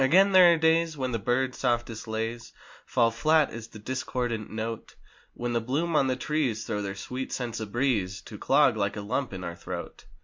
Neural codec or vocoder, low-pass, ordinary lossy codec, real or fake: none; 7.2 kHz; MP3, 48 kbps; real